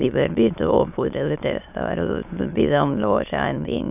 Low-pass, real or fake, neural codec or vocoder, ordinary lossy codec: 3.6 kHz; fake; autoencoder, 22.05 kHz, a latent of 192 numbers a frame, VITS, trained on many speakers; none